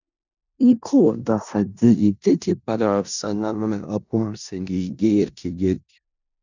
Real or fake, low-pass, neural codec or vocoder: fake; 7.2 kHz; codec, 16 kHz in and 24 kHz out, 0.4 kbps, LongCat-Audio-Codec, four codebook decoder